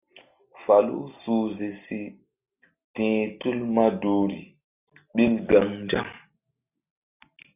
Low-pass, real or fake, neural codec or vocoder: 3.6 kHz; real; none